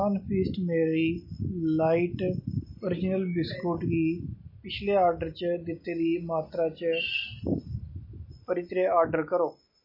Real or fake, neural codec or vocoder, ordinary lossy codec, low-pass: real; none; MP3, 24 kbps; 5.4 kHz